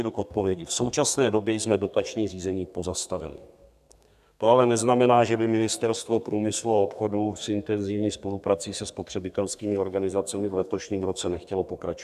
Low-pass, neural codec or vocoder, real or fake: 14.4 kHz; codec, 32 kHz, 1.9 kbps, SNAC; fake